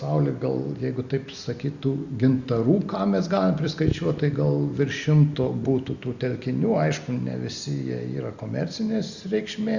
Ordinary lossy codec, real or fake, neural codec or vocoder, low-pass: Opus, 64 kbps; real; none; 7.2 kHz